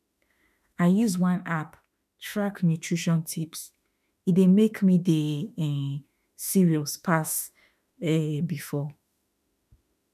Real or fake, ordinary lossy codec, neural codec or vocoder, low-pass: fake; none; autoencoder, 48 kHz, 32 numbers a frame, DAC-VAE, trained on Japanese speech; 14.4 kHz